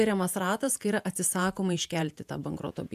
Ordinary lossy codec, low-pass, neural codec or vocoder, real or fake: AAC, 96 kbps; 14.4 kHz; vocoder, 48 kHz, 128 mel bands, Vocos; fake